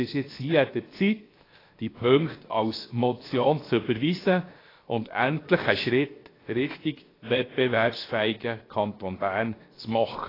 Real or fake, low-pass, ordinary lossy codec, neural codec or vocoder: fake; 5.4 kHz; AAC, 24 kbps; codec, 16 kHz, 0.7 kbps, FocalCodec